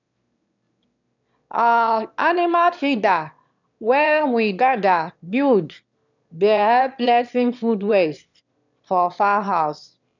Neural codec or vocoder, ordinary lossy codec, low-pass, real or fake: autoencoder, 22.05 kHz, a latent of 192 numbers a frame, VITS, trained on one speaker; none; 7.2 kHz; fake